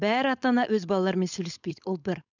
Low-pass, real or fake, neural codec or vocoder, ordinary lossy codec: 7.2 kHz; fake; codec, 16 kHz, 4.8 kbps, FACodec; none